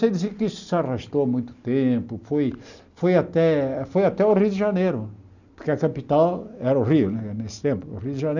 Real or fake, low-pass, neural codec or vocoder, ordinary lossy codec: real; 7.2 kHz; none; none